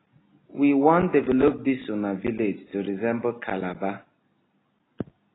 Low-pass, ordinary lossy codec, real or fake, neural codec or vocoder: 7.2 kHz; AAC, 16 kbps; real; none